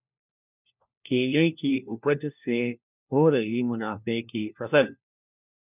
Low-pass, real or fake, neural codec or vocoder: 3.6 kHz; fake; codec, 16 kHz, 1 kbps, FunCodec, trained on LibriTTS, 50 frames a second